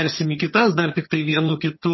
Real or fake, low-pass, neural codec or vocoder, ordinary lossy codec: fake; 7.2 kHz; vocoder, 22.05 kHz, 80 mel bands, HiFi-GAN; MP3, 24 kbps